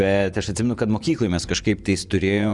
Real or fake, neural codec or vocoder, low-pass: fake; vocoder, 24 kHz, 100 mel bands, Vocos; 10.8 kHz